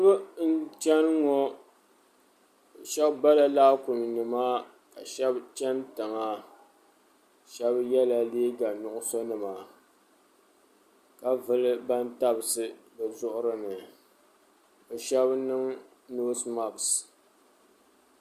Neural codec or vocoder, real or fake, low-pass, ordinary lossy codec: none; real; 14.4 kHz; Opus, 64 kbps